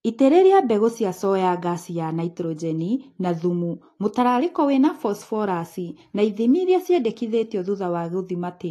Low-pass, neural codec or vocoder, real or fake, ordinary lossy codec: 14.4 kHz; none; real; AAC, 48 kbps